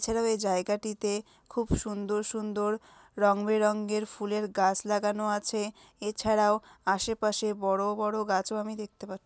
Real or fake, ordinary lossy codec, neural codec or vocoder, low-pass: real; none; none; none